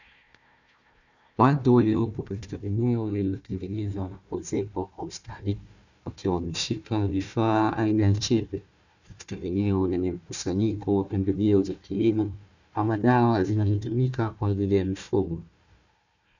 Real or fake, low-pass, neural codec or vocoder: fake; 7.2 kHz; codec, 16 kHz, 1 kbps, FunCodec, trained on Chinese and English, 50 frames a second